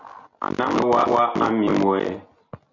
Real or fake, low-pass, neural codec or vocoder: real; 7.2 kHz; none